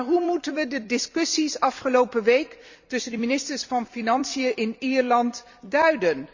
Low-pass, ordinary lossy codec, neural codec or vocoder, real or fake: 7.2 kHz; Opus, 64 kbps; vocoder, 44.1 kHz, 128 mel bands every 256 samples, BigVGAN v2; fake